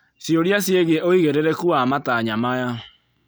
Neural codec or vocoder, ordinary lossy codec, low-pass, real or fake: none; none; none; real